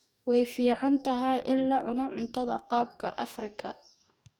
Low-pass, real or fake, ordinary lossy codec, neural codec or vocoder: 19.8 kHz; fake; none; codec, 44.1 kHz, 2.6 kbps, DAC